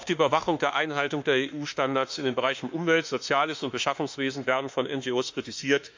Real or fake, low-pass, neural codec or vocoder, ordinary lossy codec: fake; 7.2 kHz; autoencoder, 48 kHz, 32 numbers a frame, DAC-VAE, trained on Japanese speech; MP3, 64 kbps